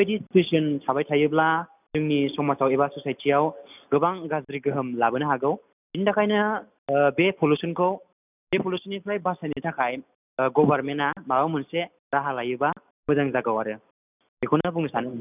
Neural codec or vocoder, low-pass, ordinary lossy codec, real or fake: none; 3.6 kHz; none; real